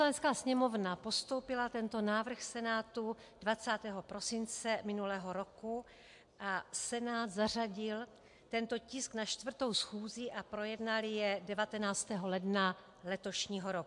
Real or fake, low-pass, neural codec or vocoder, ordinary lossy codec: real; 10.8 kHz; none; MP3, 64 kbps